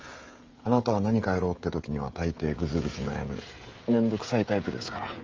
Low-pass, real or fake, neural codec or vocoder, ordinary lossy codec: 7.2 kHz; fake; codec, 16 kHz, 16 kbps, FreqCodec, smaller model; Opus, 24 kbps